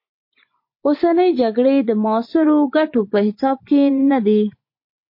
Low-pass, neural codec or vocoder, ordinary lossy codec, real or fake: 5.4 kHz; vocoder, 24 kHz, 100 mel bands, Vocos; MP3, 32 kbps; fake